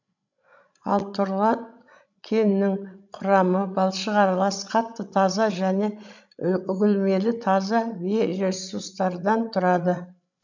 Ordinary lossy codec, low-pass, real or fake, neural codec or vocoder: none; 7.2 kHz; fake; codec, 16 kHz, 16 kbps, FreqCodec, larger model